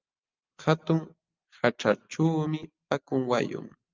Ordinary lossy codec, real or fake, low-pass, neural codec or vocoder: Opus, 24 kbps; real; 7.2 kHz; none